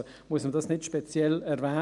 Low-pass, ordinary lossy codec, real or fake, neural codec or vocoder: 10.8 kHz; none; real; none